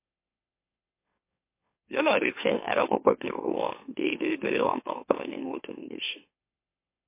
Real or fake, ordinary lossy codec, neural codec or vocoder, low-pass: fake; MP3, 24 kbps; autoencoder, 44.1 kHz, a latent of 192 numbers a frame, MeloTTS; 3.6 kHz